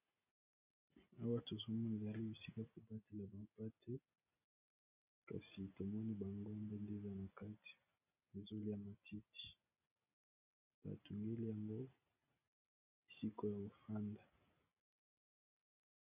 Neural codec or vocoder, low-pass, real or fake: none; 3.6 kHz; real